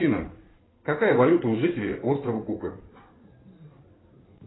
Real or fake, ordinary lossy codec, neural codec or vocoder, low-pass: fake; AAC, 16 kbps; codec, 16 kHz in and 24 kHz out, 2.2 kbps, FireRedTTS-2 codec; 7.2 kHz